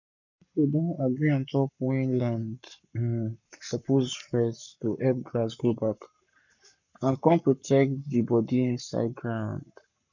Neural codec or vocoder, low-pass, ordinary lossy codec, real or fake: codec, 44.1 kHz, 7.8 kbps, Pupu-Codec; 7.2 kHz; none; fake